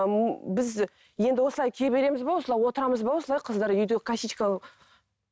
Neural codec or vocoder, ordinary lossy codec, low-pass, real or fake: none; none; none; real